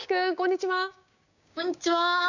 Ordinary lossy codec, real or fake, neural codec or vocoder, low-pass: none; fake; codec, 16 kHz, 6 kbps, DAC; 7.2 kHz